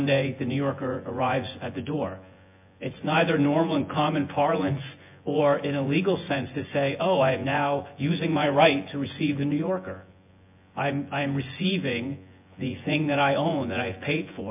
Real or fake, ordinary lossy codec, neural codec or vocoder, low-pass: fake; MP3, 32 kbps; vocoder, 24 kHz, 100 mel bands, Vocos; 3.6 kHz